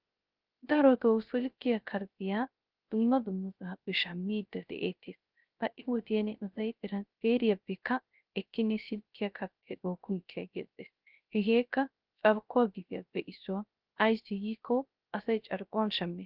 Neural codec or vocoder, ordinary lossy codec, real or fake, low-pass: codec, 16 kHz, 0.3 kbps, FocalCodec; Opus, 32 kbps; fake; 5.4 kHz